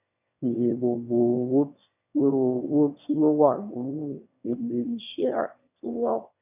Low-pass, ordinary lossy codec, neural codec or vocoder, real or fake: 3.6 kHz; none; autoencoder, 22.05 kHz, a latent of 192 numbers a frame, VITS, trained on one speaker; fake